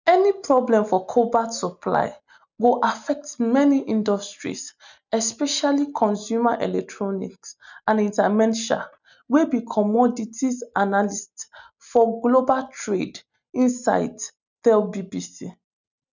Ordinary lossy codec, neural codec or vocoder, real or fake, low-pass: none; none; real; 7.2 kHz